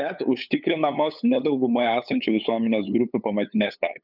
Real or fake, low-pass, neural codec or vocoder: fake; 5.4 kHz; codec, 16 kHz, 8 kbps, FunCodec, trained on LibriTTS, 25 frames a second